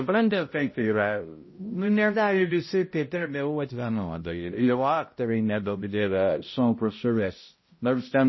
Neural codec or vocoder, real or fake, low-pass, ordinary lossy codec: codec, 16 kHz, 0.5 kbps, X-Codec, HuBERT features, trained on balanced general audio; fake; 7.2 kHz; MP3, 24 kbps